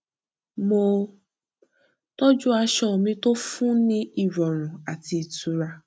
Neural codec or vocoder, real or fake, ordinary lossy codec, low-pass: none; real; none; none